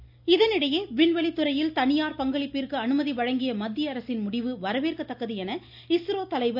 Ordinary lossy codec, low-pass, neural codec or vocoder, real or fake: none; 5.4 kHz; none; real